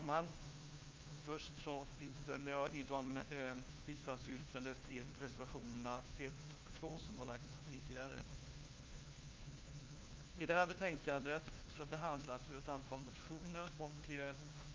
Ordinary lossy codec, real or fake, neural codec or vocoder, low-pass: Opus, 32 kbps; fake; codec, 16 kHz, 1 kbps, FunCodec, trained on LibriTTS, 50 frames a second; 7.2 kHz